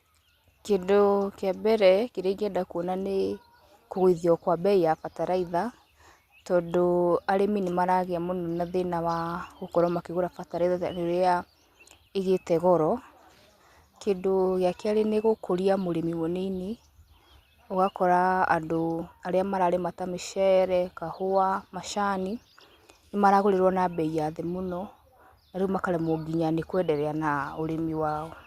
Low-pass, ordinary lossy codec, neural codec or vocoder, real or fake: 14.4 kHz; Opus, 64 kbps; none; real